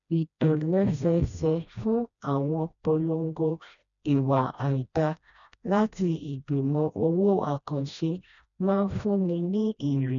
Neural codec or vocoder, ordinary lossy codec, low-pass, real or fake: codec, 16 kHz, 1 kbps, FreqCodec, smaller model; none; 7.2 kHz; fake